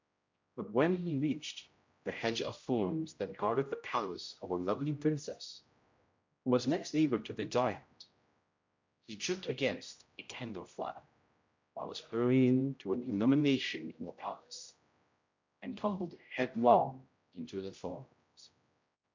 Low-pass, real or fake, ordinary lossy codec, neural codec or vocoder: 7.2 kHz; fake; MP3, 64 kbps; codec, 16 kHz, 0.5 kbps, X-Codec, HuBERT features, trained on general audio